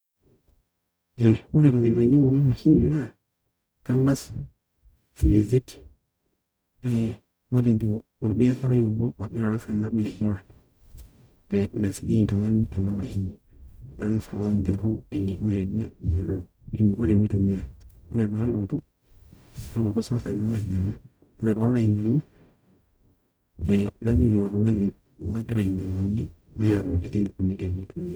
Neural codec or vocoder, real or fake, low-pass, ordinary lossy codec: codec, 44.1 kHz, 0.9 kbps, DAC; fake; none; none